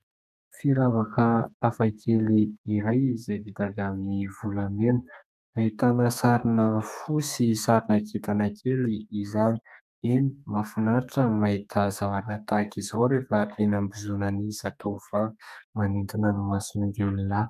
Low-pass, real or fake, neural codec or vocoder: 14.4 kHz; fake; codec, 32 kHz, 1.9 kbps, SNAC